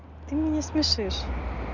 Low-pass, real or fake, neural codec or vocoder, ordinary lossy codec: 7.2 kHz; real; none; none